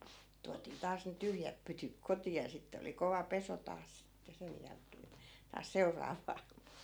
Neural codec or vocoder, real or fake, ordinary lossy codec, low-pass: none; real; none; none